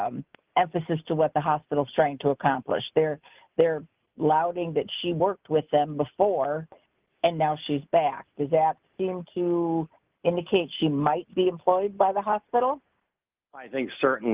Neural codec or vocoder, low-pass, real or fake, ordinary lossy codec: none; 3.6 kHz; real; Opus, 24 kbps